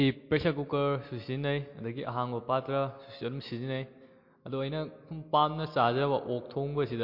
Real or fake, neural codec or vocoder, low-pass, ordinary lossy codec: real; none; 5.4 kHz; MP3, 32 kbps